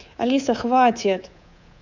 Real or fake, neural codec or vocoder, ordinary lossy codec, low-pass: fake; codec, 24 kHz, 3.1 kbps, DualCodec; none; 7.2 kHz